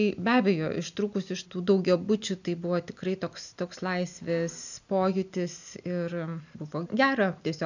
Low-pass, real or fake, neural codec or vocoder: 7.2 kHz; real; none